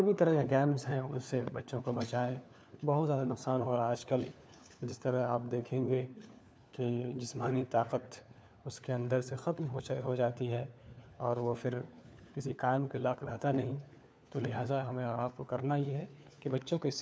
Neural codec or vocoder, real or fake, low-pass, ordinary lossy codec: codec, 16 kHz, 4 kbps, FunCodec, trained on LibriTTS, 50 frames a second; fake; none; none